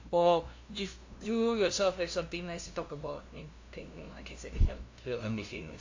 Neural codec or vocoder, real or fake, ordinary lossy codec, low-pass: codec, 16 kHz, 0.5 kbps, FunCodec, trained on LibriTTS, 25 frames a second; fake; AAC, 48 kbps; 7.2 kHz